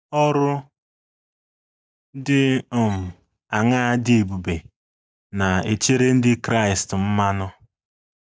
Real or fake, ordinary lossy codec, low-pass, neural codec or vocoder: real; none; none; none